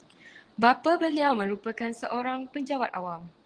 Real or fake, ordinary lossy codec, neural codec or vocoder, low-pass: fake; Opus, 16 kbps; vocoder, 22.05 kHz, 80 mel bands, WaveNeXt; 9.9 kHz